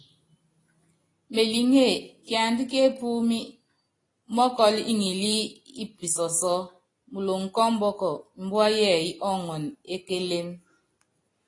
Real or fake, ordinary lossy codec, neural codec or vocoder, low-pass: real; AAC, 32 kbps; none; 10.8 kHz